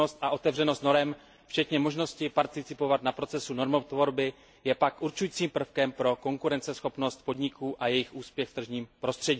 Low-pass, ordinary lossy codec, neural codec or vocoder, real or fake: none; none; none; real